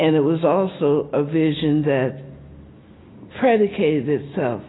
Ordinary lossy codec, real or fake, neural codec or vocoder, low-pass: AAC, 16 kbps; real; none; 7.2 kHz